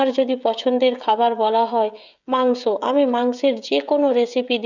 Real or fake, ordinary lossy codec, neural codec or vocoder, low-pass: fake; none; codec, 16 kHz, 16 kbps, FreqCodec, smaller model; 7.2 kHz